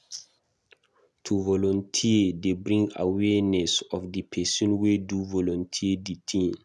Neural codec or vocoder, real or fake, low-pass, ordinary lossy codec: none; real; none; none